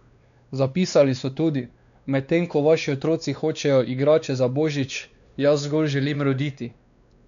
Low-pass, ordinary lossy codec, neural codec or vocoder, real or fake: 7.2 kHz; none; codec, 16 kHz, 2 kbps, X-Codec, WavLM features, trained on Multilingual LibriSpeech; fake